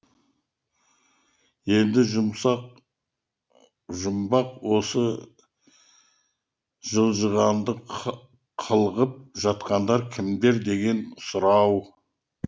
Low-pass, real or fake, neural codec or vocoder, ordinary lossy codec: none; real; none; none